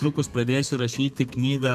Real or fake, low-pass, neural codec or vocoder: fake; 14.4 kHz; codec, 32 kHz, 1.9 kbps, SNAC